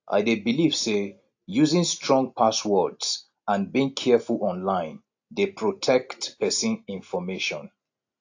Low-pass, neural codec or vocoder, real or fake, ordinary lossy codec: 7.2 kHz; none; real; AAC, 48 kbps